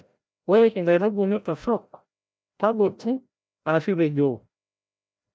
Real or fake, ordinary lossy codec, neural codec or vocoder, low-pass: fake; none; codec, 16 kHz, 0.5 kbps, FreqCodec, larger model; none